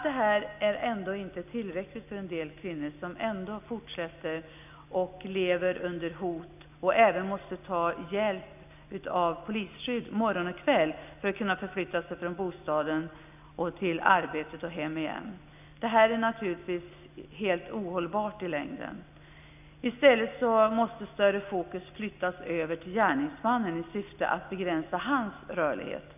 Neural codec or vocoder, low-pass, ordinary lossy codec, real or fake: none; 3.6 kHz; none; real